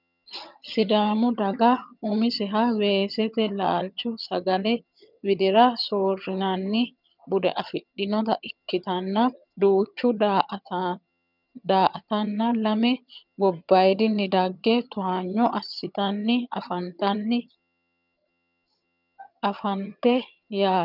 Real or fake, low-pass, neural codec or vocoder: fake; 5.4 kHz; vocoder, 22.05 kHz, 80 mel bands, HiFi-GAN